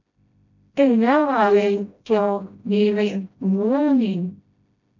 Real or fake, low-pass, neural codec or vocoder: fake; 7.2 kHz; codec, 16 kHz, 0.5 kbps, FreqCodec, smaller model